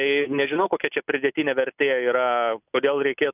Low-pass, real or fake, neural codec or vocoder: 3.6 kHz; real; none